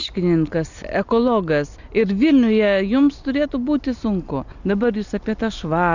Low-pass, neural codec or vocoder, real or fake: 7.2 kHz; none; real